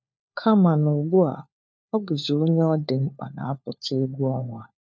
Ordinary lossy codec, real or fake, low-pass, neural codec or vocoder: none; fake; none; codec, 16 kHz, 4 kbps, FunCodec, trained on LibriTTS, 50 frames a second